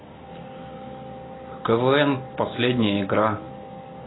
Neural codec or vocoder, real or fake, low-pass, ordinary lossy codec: none; real; 7.2 kHz; AAC, 16 kbps